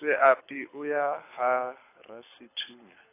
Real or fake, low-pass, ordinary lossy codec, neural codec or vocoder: fake; 3.6 kHz; AAC, 24 kbps; codec, 24 kHz, 6 kbps, HILCodec